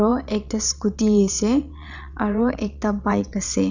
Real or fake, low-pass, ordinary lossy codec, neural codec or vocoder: fake; 7.2 kHz; none; vocoder, 22.05 kHz, 80 mel bands, WaveNeXt